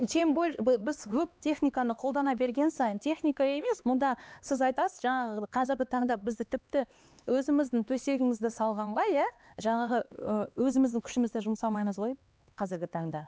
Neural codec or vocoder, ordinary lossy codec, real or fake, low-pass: codec, 16 kHz, 2 kbps, X-Codec, HuBERT features, trained on LibriSpeech; none; fake; none